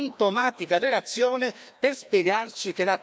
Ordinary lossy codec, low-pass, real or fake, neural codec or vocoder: none; none; fake; codec, 16 kHz, 1 kbps, FreqCodec, larger model